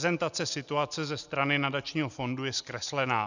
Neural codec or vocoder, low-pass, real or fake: none; 7.2 kHz; real